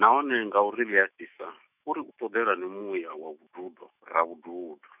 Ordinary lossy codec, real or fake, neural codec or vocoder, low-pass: none; fake; autoencoder, 48 kHz, 128 numbers a frame, DAC-VAE, trained on Japanese speech; 3.6 kHz